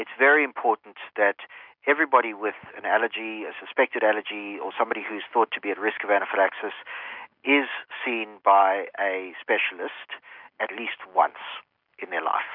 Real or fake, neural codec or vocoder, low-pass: real; none; 5.4 kHz